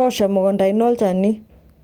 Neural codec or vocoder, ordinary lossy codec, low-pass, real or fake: none; Opus, 24 kbps; 19.8 kHz; real